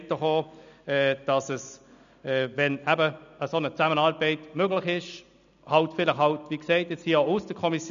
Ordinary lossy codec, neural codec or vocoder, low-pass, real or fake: none; none; 7.2 kHz; real